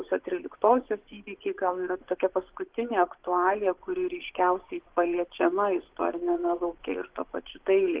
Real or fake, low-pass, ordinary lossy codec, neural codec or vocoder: fake; 3.6 kHz; Opus, 16 kbps; vocoder, 24 kHz, 100 mel bands, Vocos